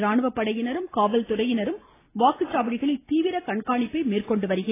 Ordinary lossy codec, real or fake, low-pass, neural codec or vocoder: AAC, 16 kbps; real; 3.6 kHz; none